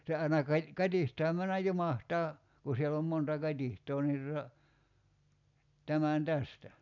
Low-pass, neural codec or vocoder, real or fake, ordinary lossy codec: 7.2 kHz; none; real; none